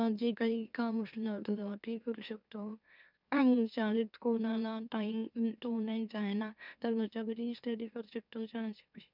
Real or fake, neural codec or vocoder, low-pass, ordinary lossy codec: fake; autoencoder, 44.1 kHz, a latent of 192 numbers a frame, MeloTTS; 5.4 kHz; none